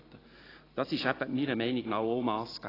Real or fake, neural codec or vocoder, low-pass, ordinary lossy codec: real; none; 5.4 kHz; AAC, 24 kbps